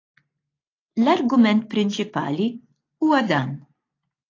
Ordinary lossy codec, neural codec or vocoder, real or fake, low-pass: AAC, 32 kbps; none; real; 7.2 kHz